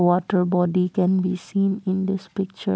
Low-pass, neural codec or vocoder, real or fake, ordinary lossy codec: none; none; real; none